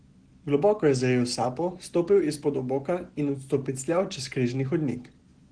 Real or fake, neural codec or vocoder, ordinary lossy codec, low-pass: real; none; Opus, 16 kbps; 9.9 kHz